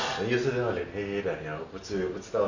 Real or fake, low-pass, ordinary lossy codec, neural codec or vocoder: real; 7.2 kHz; none; none